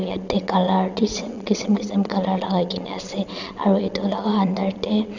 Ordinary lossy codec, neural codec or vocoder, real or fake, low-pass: none; vocoder, 22.05 kHz, 80 mel bands, WaveNeXt; fake; 7.2 kHz